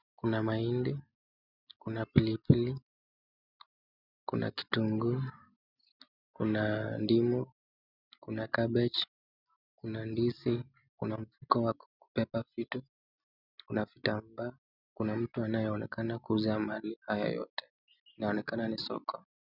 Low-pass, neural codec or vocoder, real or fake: 5.4 kHz; none; real